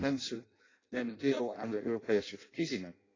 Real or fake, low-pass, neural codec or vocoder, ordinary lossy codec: fake; 7.2 kHz; codec, 16 kHz in and 24 kHz out, 0.6 kbps, FireRedTTS-2 codec; AAC, 32 kbps